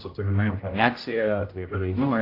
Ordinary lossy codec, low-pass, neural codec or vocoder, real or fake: AAC, 32 kbps; 5.4 kHz; codec, 16 kHz, 0.5 kbps, X-Codec, HuBERT features, trained on general audio; fake